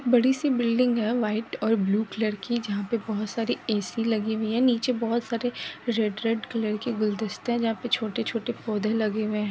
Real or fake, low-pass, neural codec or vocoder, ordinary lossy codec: real; none; none; none